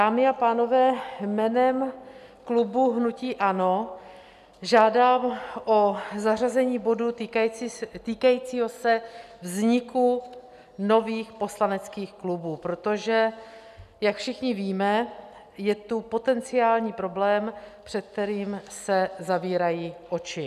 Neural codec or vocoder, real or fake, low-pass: none; real; 14.4 kHz